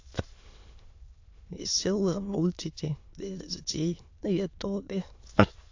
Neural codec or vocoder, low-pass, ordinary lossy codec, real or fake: autoencoder, 22.05 kHz, a latent of 192 numbers a frame, VITS, trained on many speakers; 7.2 kHz; AAC, 48 kbps; fake